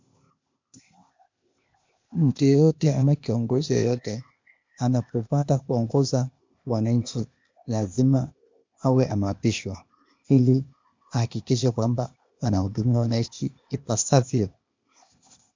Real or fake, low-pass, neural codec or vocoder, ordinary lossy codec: fake; 7.2 kHz; codec, 16 kHz, 0.8 kbps, ZipCodec; MP3, 64 kbps